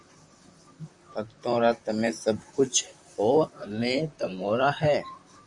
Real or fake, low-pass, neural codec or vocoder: fake; 10.8 kHz; vocoder, 44.1 kHz, 128 mel bands, Pupu-Vocoder